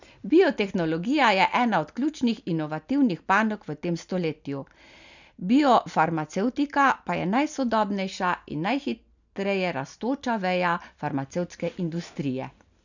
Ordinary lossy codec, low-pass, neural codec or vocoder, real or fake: none; 7.2 kHz; none; real